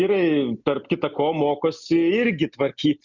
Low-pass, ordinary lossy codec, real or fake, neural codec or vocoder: 7.2 kHz; Opus, 64 kbps; real; none